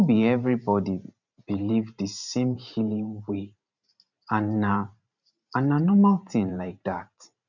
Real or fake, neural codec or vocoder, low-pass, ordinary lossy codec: fake; vocoder, 44.1 kHz, 128 mel bands every 512 samples, BigVGAN v2; 7.2 kHz; none